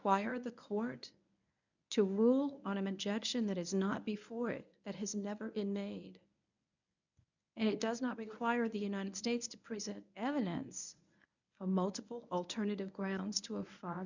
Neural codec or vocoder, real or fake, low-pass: codec, 24 kHz, 0.9 kbps, WavTokenizer, medium speech release version 1; fake; 7.2 kHz